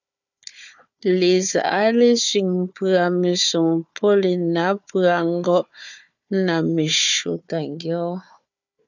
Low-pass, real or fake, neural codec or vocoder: 7.2 kHz; fake; codec, 16 kHz, 4 kbps, FunCodec, trained on Chinese and English, 50 frames a second